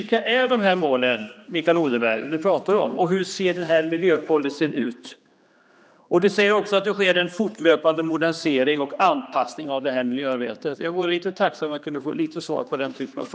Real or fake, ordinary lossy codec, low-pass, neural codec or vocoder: fake; none; none; codec, 16 kHz, 2 kbps, X-Codec, HuBERT features, trained on general audio